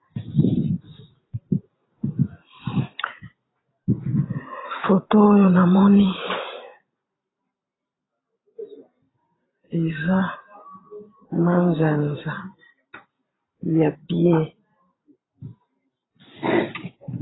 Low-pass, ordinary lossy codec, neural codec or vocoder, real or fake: 7.2 kHz; AAC, 16 kbps; vocoder, 44.1 kHz, 128 mel bands every 512 samples, BigVGAN v2; fake